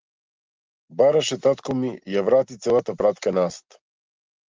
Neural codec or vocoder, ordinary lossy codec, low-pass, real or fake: none; Opus, 32 kbps; 7.2 kHz; real